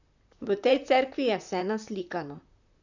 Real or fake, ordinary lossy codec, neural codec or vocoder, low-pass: fake; none; vocoder, 44.1 kHz, 128 mel bands, Pupu-Vocoder; 7.2 kHz